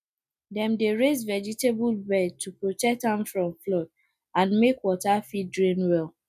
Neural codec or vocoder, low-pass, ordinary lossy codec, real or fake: none; 14.4 kHz; none; real